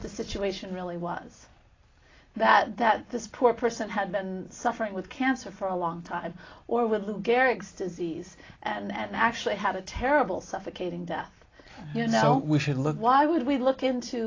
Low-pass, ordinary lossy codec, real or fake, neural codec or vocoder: 7.2 kHz; AAC, 32 kbps; real; none